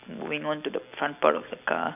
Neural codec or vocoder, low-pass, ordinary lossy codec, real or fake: none; 3.6 kHz; none; real